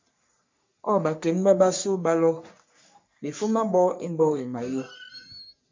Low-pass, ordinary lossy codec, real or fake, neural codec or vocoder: 7.2 kHz; MP3, 64 kbps; fake; codec, 44.1 kHz, 3.4 kbps, Pupu-Codec